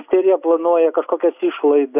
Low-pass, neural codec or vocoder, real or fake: 3.6 kHz; none; real